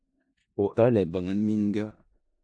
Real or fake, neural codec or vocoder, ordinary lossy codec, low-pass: fake; codec, 16 kHz in and 24 kHz out, 0.4 kbps, LongCat-Audio-Codec, four codebook decoder; AAC, 64 kbps; 9.9 kHz